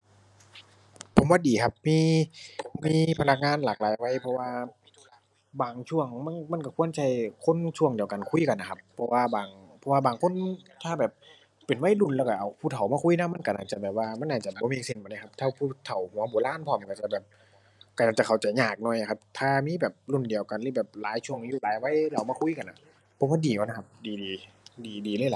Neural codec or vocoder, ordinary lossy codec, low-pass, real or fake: none; none; none; real